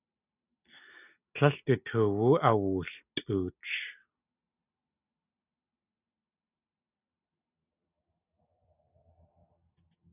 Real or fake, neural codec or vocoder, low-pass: real; none; 3.6 kHz